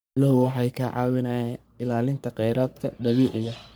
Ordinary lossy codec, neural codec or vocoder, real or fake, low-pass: none; codec, 44.1 kHz, 3.4 kbps, Pupu-Codec; fake; none